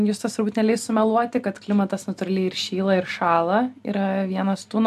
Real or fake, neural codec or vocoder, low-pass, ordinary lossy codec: real; none; 14.4 kHz; AAC, 96 kbps